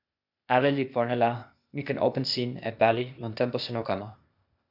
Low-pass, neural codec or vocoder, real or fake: 5.4 kHz; codec, 16 kHz, 0.8 kbps, ZipCodec; fake